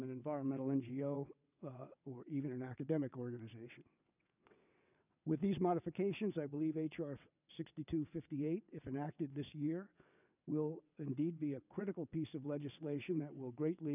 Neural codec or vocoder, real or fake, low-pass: vocoder, 22.05 kHz, 80 mel bands, WaveNeXt; fake; 3.6 kHz